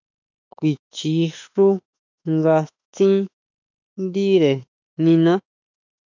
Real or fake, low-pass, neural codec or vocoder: fake; 7.2 kHz; autoencoder, 48 kHz, 32 numbers a frame, DAC-VAE, trained on Japanese speech